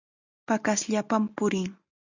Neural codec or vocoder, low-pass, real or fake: none; 7.2 kHz; real